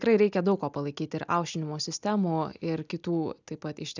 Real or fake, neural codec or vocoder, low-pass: real; none; 7.2 kHz